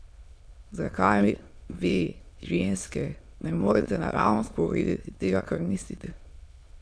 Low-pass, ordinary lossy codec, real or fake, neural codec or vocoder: none; none; fake; autoencoder, 22.05 kHz, a latent of 192 numbers a frame, VITS, trained on many speakers